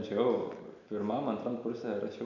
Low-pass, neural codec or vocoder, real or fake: 7.2 kHz; none; real